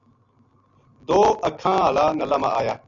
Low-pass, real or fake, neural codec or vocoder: 7.2 kHz; real; none